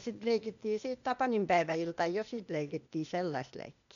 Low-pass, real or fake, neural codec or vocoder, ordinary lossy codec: 7.2 kHz; fake; codec, 16 kHz, 0.8 kbps, ZipCodec; none